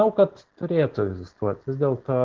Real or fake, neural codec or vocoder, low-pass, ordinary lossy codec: real; none; 7.2 kHz; Opus, 16 kbps